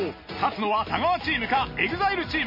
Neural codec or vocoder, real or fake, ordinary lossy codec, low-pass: none; real; MP3, 24 kbps; 5.4 kHz